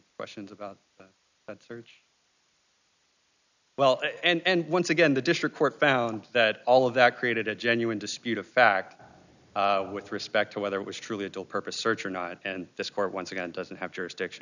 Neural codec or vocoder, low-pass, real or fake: none; 7.2 kHz; real